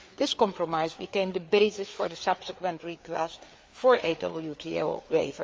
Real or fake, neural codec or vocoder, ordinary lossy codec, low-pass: fake; codec, 16 kHz, 4 kbps, FreqCodec, larger model; none; none